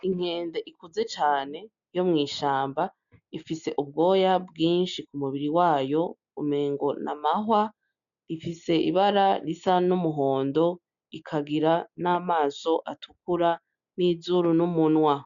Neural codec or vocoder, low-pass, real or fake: none; 7.2 kHz; real